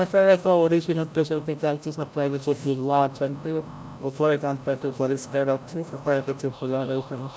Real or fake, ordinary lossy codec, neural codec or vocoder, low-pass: fake; none; codec, 16 kHz, 0.5 kbps, FreqCodec, larger model; none